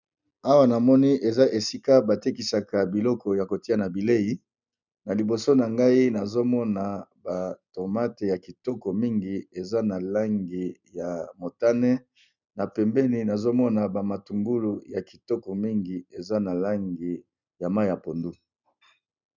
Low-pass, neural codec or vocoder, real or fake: 7.2 kHz; none; real